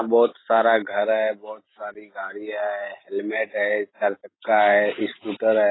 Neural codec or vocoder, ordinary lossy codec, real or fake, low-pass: none; AAC, 16 kbps; real; 7.2 kHz